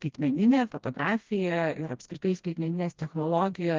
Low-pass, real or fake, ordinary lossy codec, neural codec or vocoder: 7.2 kHz; fake; Opus, 24 kbps; codec, 16 kHz, 1 kbps, FreqCodec, smaller model